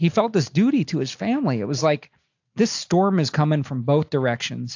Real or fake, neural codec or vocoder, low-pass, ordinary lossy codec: real; none; 7.2 kHz; AAC, 48 kbps